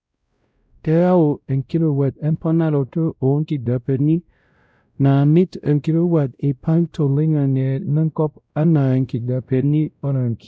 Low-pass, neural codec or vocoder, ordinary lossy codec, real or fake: none; codec, 16 kHz, 0.5 kbps, X-Codec, WavLM features, trained on Multilingual LibriSpeech; none; fake